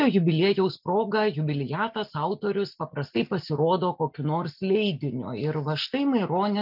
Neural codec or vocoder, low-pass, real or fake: none; 5.4 kHz; real